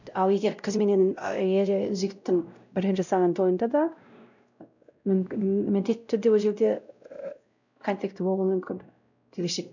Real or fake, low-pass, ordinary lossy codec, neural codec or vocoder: fake; 7.2 kHz; none; codec, 16 kHz, 0.5 kbps, X-Codec, WavLM features, trained on Multilingual LibriSpeech